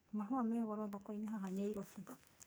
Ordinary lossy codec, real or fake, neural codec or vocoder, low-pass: none; fake; codec, 44.1 kHz, 2.6 kbps, SNAC; none